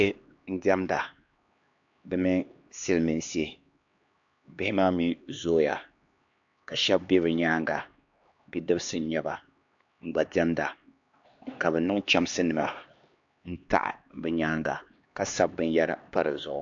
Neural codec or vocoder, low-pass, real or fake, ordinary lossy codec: codec, 16 kHz, 2 kbps, X-Codec, HuBERT features, trained on LibriSpeech; 7.2 kHz; fake; AAC, 64 kbps